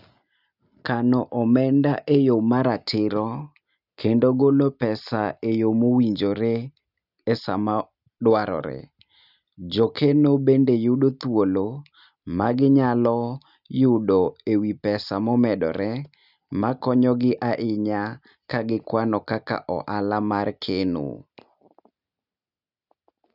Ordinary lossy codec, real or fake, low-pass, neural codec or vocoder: none; real; 5.4 kHz; none